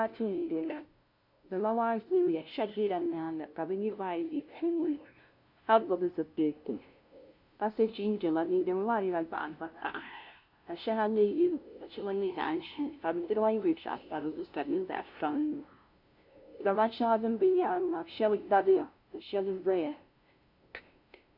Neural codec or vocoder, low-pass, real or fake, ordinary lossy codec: codec, 16 kHz, 0.5 kbps, FunCodec, trained on LibriTTS, 25 frames a second; 5.4 kHz; fake; MP3, 48 kbps